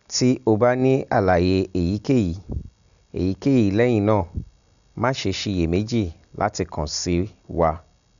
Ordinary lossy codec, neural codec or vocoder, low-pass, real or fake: none; none; 7.2 kHz; real